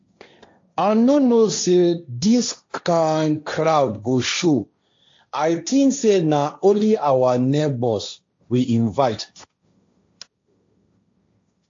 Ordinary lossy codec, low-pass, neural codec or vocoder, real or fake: AAC, 48 kbps; 7.2 kHz; codec, 16 kHz, 1.1 kbps, Voila-Tokenizer; fake